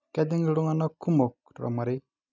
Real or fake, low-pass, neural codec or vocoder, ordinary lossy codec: real; 7.2 kHz; none; none